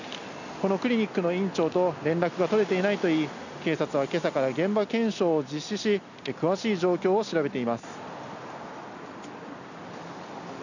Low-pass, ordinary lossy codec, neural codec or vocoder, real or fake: 7.2 kHz; none; none; real